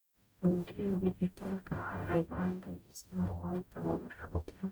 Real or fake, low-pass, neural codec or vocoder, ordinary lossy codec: fake; none; codec, 44.1 kHz, 0.9 kbps, DAC; none